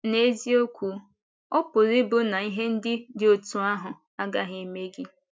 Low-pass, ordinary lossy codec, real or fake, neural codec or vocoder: none; none; real; none